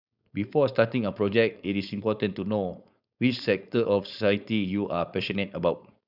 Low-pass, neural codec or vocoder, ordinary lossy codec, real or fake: 5.4 kHz; codec, 16 kHz, 4.8 kbps, FACodec; none; fake